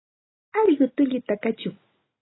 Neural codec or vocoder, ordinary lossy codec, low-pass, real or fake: none; AAC, 16 kbps; 7.2 kHz; real